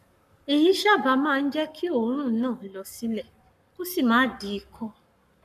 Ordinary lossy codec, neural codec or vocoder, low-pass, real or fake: none; codec, 44.1 kHz, 7.8 kbps, Pupu-Codec; 14.4 kHz; fake